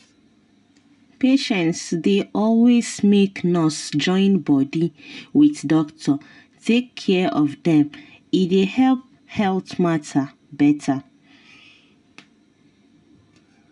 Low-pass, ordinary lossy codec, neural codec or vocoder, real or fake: 10.8 kHz; none; none; real